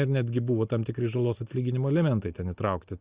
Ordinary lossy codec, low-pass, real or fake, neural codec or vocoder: Opus, 64 kbps; 3.6 kHz; real; none